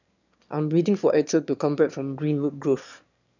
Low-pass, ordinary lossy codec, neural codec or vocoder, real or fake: 7.2 kHz; none; autoencoder, 22.05 kHz, a latent of 192 numbers a frame, VITS, trained on one speaker; fake